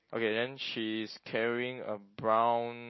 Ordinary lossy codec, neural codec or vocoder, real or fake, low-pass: MP3, 24 kbps; none; real; 7.2 kHz